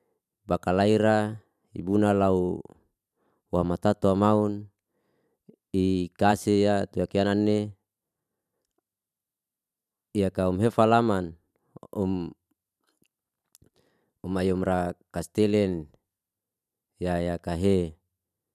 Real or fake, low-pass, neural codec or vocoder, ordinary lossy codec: fake; 14.4 kHz; vocoder, 44.1 kHz, 128 mel bands every 512 samples, BigVGAN v2; none